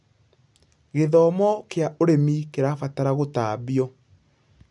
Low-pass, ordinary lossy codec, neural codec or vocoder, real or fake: 10.8 kHz; none; none; real